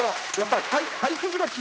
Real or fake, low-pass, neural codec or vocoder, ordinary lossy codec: fake; none; codec, 16 kHz, 1 kbps, X-Codec, HuBERT features, trained on general audio; none